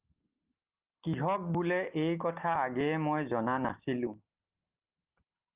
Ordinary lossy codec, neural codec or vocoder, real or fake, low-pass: Opus, 24 kbps; none; real; 3.6 kHz